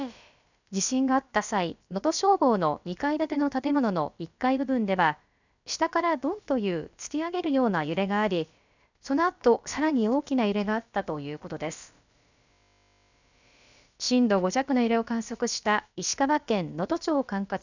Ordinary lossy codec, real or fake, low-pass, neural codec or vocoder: none; fake; 7.2 kHz; codec, 16 kHz, about 1 kbps, DyCAST, with the encoder's durations